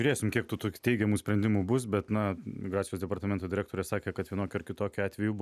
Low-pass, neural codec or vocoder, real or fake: 14.4 kHz; none; real